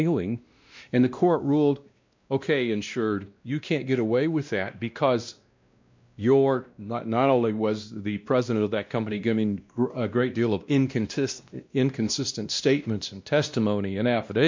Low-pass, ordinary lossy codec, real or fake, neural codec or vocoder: 7.2 kHz; MP3, 64 kbps; fake; codec, 16 kHz, 1 kbps, X-Codec, WavLM features, trained on Multilingual LibriSpeech